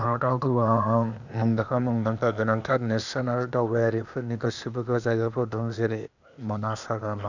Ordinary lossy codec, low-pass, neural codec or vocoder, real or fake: none; 7.2 kHz; codec, 16 kHz, 0.8 kbps, ZipCodec; fake